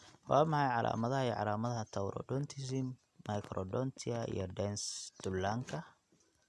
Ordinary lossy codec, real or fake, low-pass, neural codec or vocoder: none; real; none; none